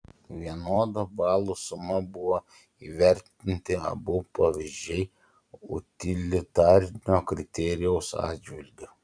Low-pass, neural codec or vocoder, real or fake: 9.9 kHz; vocoder, 44.1 kHz, 128 mel bands every 256 samples, BigVGAN v2; fake